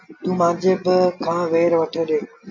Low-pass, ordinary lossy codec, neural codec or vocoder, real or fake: 7.2 kHz; AAC, 48 kbps; none; real